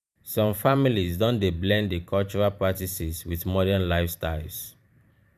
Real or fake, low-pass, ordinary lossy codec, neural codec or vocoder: fake; 14.4 kHz; none; vocoder, 44.1 kHz, 128 mel bands every 512 samples, BigVGAN v2